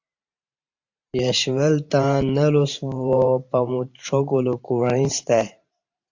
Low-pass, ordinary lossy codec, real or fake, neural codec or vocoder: 7.2 kHz; AAC, 48 kbps; fake; vocoder, 44.1 kHz, 128 mel bands every 512 samples, BigVGAN v2